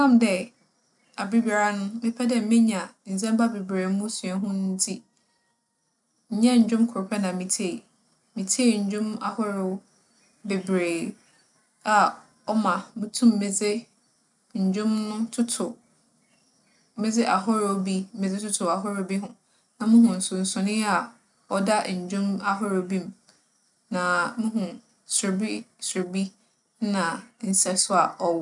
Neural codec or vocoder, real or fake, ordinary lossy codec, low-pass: none; real; none; 10.8 kHz